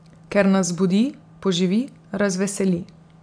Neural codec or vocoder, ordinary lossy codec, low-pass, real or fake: none; AAC, 64 kbps; 9.9 kHz; real